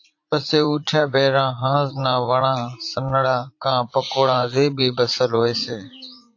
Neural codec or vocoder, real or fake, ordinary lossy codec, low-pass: vocoder, 44.1 kHz, 80 mel bands, Vocos; fake; AAC, 48 kbps; 7.2 kHz